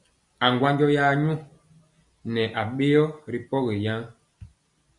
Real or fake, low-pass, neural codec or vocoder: real; 10.8 kHz; none